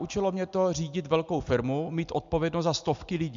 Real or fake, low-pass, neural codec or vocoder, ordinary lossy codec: real; 7.2 kHz; none; MP3, 64 kbps